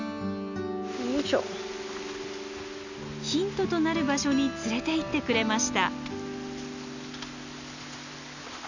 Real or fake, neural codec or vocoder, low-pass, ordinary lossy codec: real; none; 7.2 kHz; none